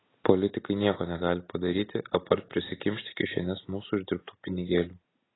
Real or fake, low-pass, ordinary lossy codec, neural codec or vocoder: real; 7.2 kHz; AAC, 16 kbps; none